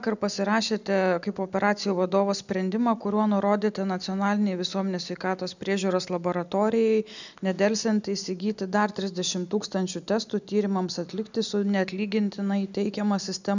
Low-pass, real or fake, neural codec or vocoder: 7.2 kHz; real; none